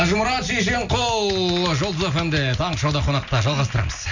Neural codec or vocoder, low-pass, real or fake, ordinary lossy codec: none; 7.2 kHz; real; none